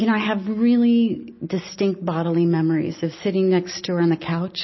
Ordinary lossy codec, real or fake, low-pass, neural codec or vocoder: MP3, 24 kbps; real; 7.2 kHz; none